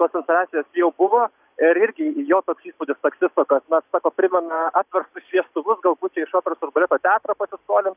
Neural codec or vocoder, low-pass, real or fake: none; 3.6 kHz; real